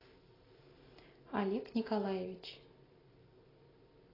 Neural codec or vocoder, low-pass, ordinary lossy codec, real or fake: none; 5.4 kHz; AAC, 24 kbps; real